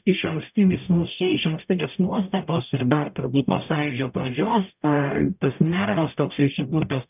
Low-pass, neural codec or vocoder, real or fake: 3.6 kHz; codec, 44.1 kHz, 0.9 kbps, DAC; fake